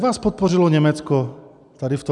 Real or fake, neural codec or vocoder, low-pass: real; none; 10.8 kHz